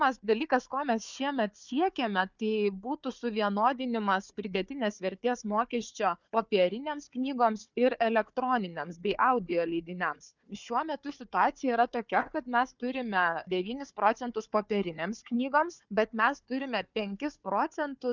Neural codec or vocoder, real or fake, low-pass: codec, 16 kHz, 4 kbps, FunCodec, trained on Chinese and English, 50 frames a second; fake; 7.2 kHz